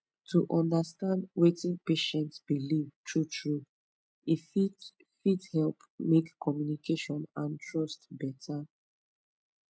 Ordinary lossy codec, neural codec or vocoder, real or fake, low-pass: none; none; real; none